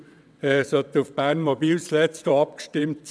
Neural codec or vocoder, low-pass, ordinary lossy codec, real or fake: vocoder, 22.05 kHz, 80 mel bands, WaveNeXt; none; none; fake